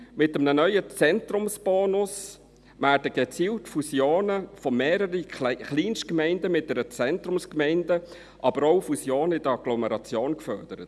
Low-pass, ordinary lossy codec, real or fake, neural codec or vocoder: none; none; real; none